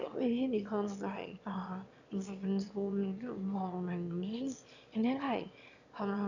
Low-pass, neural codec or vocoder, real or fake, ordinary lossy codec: 7.2 kHz; autoencoder, 22.05 kHz, a latent of 192 numbers a frame, VITS, trained on one speaker; fake; none